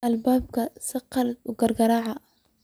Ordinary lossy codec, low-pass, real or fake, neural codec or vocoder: none; none; real; none